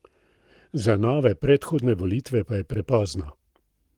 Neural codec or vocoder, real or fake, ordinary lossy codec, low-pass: codec, 44.1 kHz, 7.8 kbps, Pupu-Codec; fake; Opus, 24 kbps; 19.8 kHz